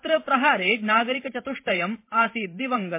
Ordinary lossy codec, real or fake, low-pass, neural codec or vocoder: MP3, 24 kbps; fake; 3.6 kHz; vocoder, 44.1 kHz, 128 mel bands every 512 samples, BigVGAN v2